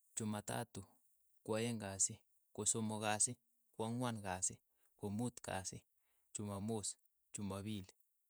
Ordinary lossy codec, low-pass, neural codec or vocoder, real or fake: none; none; vocoder, 48 kHz, 128 mel bands, Vocos; fake